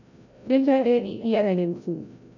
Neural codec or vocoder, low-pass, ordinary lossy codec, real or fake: codec, 16 kHz, 0.5 kbps, FreqCodec, larger model; 7.2 kHz; none; fake